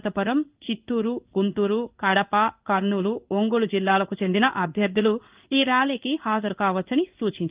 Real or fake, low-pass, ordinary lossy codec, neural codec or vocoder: fake; 3.6 kHz; Opus, 32 kbps; codec, 16 kHz in and 24 kHz out, 1 kbps, XY-Tokenizer